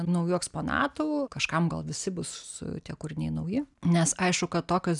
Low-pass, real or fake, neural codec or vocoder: 10.8 kHz; real; none